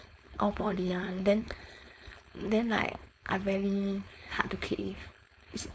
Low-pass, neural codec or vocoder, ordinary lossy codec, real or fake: none; codec, 16 kHz, 4.8 kbps, FACodec; none; fake